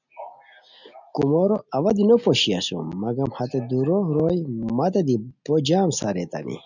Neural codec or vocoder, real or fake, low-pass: none; real; 7.2 kHz